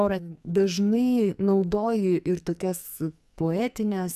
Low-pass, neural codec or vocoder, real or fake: 14.4 kHz; codec, 44.1 kHz, 2.6 kbps, SNAC; fake